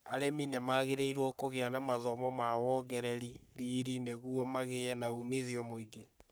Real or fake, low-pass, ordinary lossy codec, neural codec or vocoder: fake; none; none; codec, 44.1 kHz, 3.4 kbps, Pupu-Codec